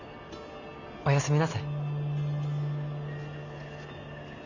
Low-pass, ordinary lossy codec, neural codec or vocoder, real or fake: 7.2 kHz; none; none; real